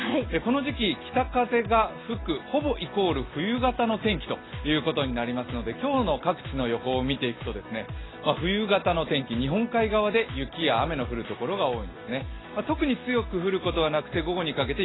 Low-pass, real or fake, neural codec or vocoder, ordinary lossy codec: 7.2 kHz; real; none; AAC, 16 kbps